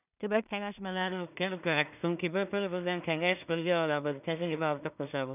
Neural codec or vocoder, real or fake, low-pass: codec, 16 kHz in and 24 kHz out, 0.4 kbps, LongCat-Audio-Codec, two codebook decoder; fake; 3.6 kHz